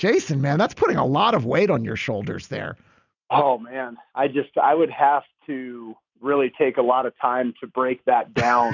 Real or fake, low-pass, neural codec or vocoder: real; 7.2 kHz; none